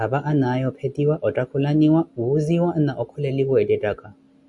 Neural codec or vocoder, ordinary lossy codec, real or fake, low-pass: none; MP3, 96 kbps; real; 10.8 kHz